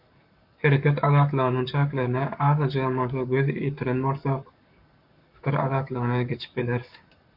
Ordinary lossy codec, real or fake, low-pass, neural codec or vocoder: MP3, 48 kbps; fake; 5.4 kHz; codec, 44.1 kHz, 7.8 kbps, Pupu-Codec